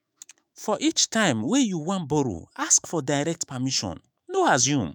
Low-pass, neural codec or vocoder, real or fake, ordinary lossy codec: none; autoencoder, 48 kHz, 128 numbers a frame, DAC-VAE, trained on Japanese speech; fake; none